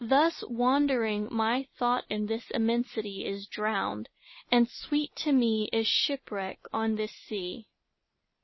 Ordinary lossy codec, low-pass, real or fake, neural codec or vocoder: MP3, 24 kbps; 7.2 kHz; real; none